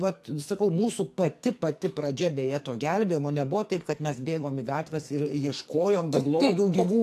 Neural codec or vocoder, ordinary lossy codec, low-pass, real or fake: codec, 44.1 kHz, 2.6 kbps, SNAC; AAC, 64 kbps; 14.4 kHz; fake